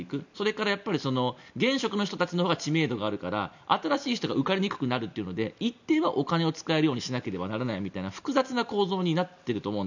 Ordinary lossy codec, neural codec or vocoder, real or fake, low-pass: none; none; real; 7.2 kHz